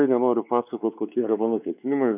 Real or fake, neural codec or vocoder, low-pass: fake; codec, 16 kHz, 4 kbps, X-Codec, WavLM features, trained on Multilingual LibriSpeech; 3.6 kHz